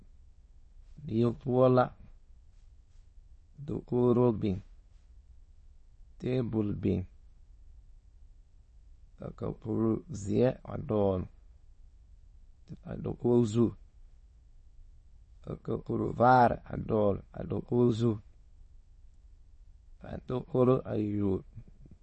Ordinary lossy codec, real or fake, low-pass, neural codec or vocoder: MP3, 32 kbps; fake; 9.9 kHz; autoencoder, 22.05 kHz, a latent of 192 numbers a frame, VITS, trained on many speakers